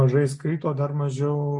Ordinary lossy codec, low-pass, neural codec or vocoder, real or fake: MP3, 64 kbps; 10.8 kHz; none; real